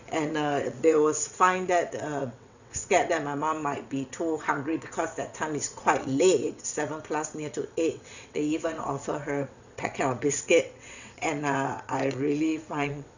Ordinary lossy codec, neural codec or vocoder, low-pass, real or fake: none; vocoder, 22.05 kHz, 80 mel bands, WaveNeXt; 7.2 kHz; fake